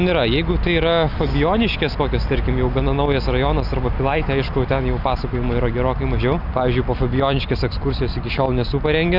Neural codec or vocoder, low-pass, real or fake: none; 5.4 kHz; real